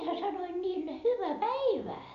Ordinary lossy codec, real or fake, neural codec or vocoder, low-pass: none; real; none; 7.2 kHz